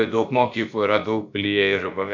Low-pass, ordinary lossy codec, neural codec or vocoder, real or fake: 7.2 kHz; MP3, 64 kbps; codec, 16 kHz, about 1 kbps, DyCAST, with the encoder's durations; fake